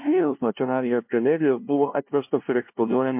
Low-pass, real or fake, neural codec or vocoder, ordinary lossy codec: 3.6 kHz; fake; codec, 16 kHz, 0.5 kbps, FunCodec, trained on LibriTTS, 25 frames a second; MP3, 32 kbps